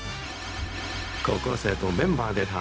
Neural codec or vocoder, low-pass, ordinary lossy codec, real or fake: codec, 16 kHz, 0.4 kbps, LongCat-Audio-Codec; none; none; fake